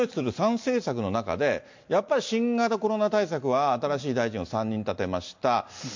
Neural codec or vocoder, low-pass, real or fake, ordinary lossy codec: none; 7.2 kHz; real; MP3, 48 kbps